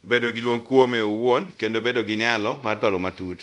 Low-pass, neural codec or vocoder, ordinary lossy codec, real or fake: 10.8 kHz; codec, 24 kHz, 0.5 kbps, DualCodec; none; fake